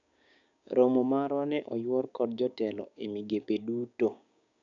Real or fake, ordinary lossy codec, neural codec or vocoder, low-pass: fake; none; codec, 16 kHz, 6 kbps, DAC; 7.2 kHz